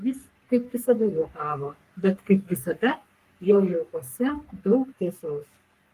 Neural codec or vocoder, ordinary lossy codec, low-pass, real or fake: codec, 44.1 kHz, 3.4 kbps, Pupu-Codec; Opus, 32 kbps; 14.4 kHz; fake